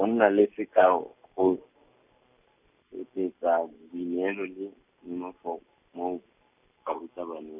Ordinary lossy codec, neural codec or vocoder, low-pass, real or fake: none; none; 3.6 kHz; real